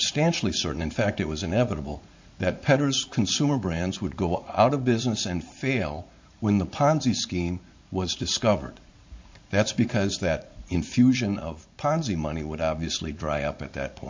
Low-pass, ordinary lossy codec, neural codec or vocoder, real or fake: 7.2 kHz; MP3, 48 kbps; vocoder, 44.1 kHz, 80 mel bands, Vocos; fake